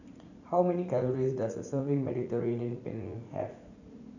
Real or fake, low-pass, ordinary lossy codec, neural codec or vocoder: fake; 7.2 kHz; none; vocoder, 44.1 kHz, 80 mel bands, Vocos